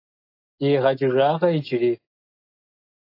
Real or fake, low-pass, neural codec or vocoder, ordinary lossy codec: real; 5.4 kHz; none; AAC, 32 kbps